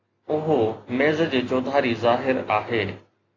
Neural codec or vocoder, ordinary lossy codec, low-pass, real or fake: none; AAC, 32 kbps; 7.2 kHz; real